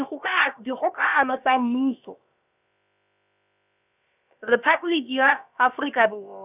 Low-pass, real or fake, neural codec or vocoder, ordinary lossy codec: 3.6 kHz; fake; codec, 16 kHz, about 1 kbps, DyCAST, with the encoder's durations; none